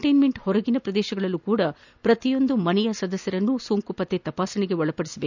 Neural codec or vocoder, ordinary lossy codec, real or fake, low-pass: none; none; real; 7.2 kHz